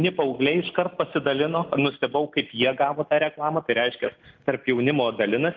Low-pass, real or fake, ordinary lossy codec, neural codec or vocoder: 7.2 kHz; real; Opus, 32 kbps; none